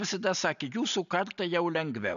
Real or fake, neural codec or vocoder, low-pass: real; none; 7.2 kHz